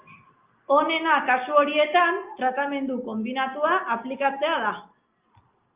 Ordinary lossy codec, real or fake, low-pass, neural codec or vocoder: Opus, 24 kbps; real; 3.6 kHz; none